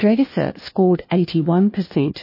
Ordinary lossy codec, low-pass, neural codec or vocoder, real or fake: MP3, 24 kbps; 5.4 kHz; codec, 16 kHz, 1 kbps, FunCodec, trained on LibriTTS, 50 frames a second; fake